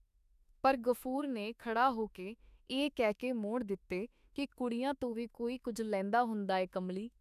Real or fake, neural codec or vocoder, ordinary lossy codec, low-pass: fake; autoencoder, 48 kHz, 32 numbers a frame, DAC-VAE, trained on Japanese speech; none; 14.4 kHz